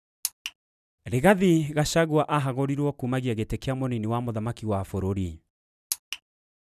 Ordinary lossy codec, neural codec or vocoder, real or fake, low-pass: none; none; real; 14.4 kHz